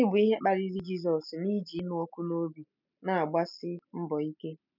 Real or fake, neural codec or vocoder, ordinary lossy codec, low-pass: real; none; none; 5.4 kHz